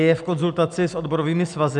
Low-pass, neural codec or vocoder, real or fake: 10.8 kHz; none; real